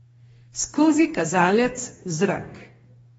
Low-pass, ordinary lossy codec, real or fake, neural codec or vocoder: 19.8 kHz; AAC, 24 kbps; fake; codec, 44.1 kHz, 2.6 kbps, DAC